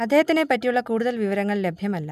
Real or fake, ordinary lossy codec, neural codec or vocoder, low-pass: real; none; none; 14.4 kHz